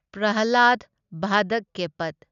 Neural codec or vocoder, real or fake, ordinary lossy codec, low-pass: none; real; none; 7.2 kHz